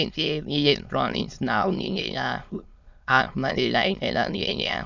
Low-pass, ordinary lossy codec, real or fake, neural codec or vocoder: 7.2 kHz; none; fake; autoencoder, 22.05 kHz, a latent of 192 numbers a frame, VITS, trained on many speakers